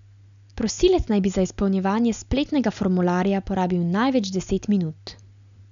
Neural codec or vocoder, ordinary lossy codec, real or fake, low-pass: none; none; real; 7.2 kHz